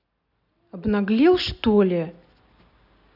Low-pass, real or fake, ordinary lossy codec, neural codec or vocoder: 5.4 kHz; real; none; none